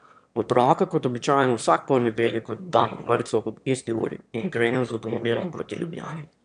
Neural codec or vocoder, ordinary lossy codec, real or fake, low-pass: autoencoder, 22.05 kHz, a latent of 192 numbers a frame, VITS, trained on one speaker; none; fake; 9.9 kHz